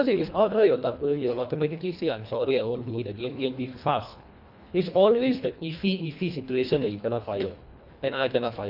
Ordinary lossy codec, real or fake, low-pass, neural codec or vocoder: none; fake; 5.4 kHz; codec, 24 kHz, 1.5 kbps, HILCodec